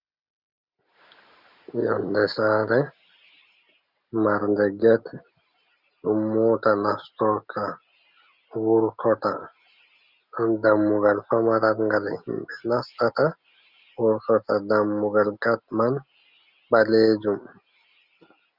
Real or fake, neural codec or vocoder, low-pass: real; none; 5.4 kHz